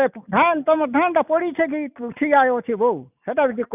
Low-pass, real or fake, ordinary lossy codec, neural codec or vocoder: 3.6 kHz; real; none; none